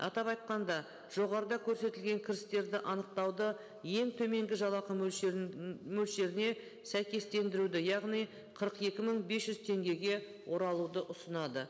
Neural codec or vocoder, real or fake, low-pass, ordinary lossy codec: none; real; none; none